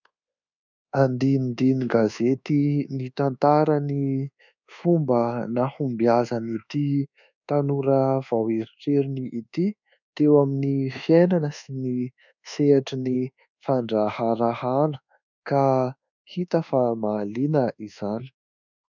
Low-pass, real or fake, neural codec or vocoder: 7.2 kHz; fake; codec, 24 kHz, 1.2 kbps, DualCodec